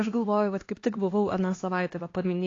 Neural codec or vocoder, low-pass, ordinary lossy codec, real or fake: codec, 16 kHz, 2 kbps, X-Codec, HuBERT features, trained on LibriSpeech; 7.2 kHz; AAC, 32 kbps; fake